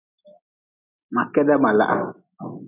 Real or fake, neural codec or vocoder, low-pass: real; none; 3.6 kHz